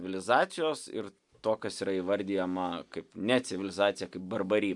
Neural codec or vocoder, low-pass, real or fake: none; 10.8 kHz; real